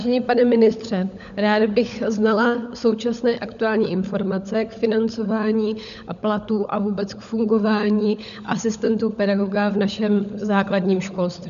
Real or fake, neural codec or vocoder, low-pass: fake; codec, 16 kHz, 16 kbps, FunCodec, trained on LibriTTS, 50 frames a second; 7.2 kHz